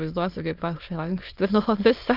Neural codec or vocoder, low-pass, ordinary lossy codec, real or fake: autoencoder, 22.05 kHz, a latent of 192 numbers a frame, VITS, trained on many speakers; 5.4 kHz; Opus, 24 kbps; fake